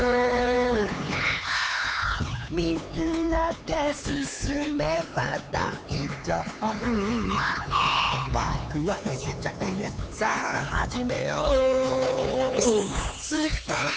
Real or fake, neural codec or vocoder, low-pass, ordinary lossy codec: fake; codec, 16 kHz, 4 kbps, X-Codec, HuBERT features, trained on LibriSpeech; none; none